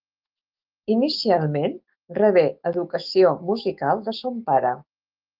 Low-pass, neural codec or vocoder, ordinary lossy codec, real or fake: 5.4 kHz; autoencoder, 48 kHz, 128 numbers a frame, DAC-VAE, trained on Japanese speech; Opus, 32 kbps; fake